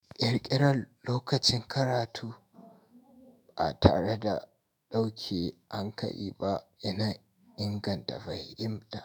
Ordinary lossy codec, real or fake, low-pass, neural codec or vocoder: none; fake; none; autoencoder, 48 kHz, 128 numbers a frame, DAC-VAE, trained on Japanese speech